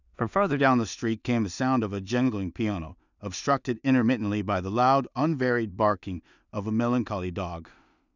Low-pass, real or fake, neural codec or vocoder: 7.2 kHz; fake; codec, 16 kHz in and 24 kHz out, 0.4 kbps, LongCat-Audio-Codec, two codebook decoder